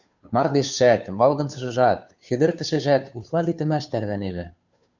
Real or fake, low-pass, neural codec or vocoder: fake; 7.2 kHz; codec, 16 kHz, 2 kbps, FunCodec, trained on Chinese and English, 25 frames a second